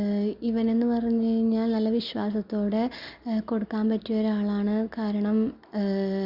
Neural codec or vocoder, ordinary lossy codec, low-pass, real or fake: none; Opus, 64 kbps; 5.4 kHz; real